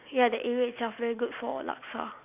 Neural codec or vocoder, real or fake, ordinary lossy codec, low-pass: none; real; none; 3.6 kHz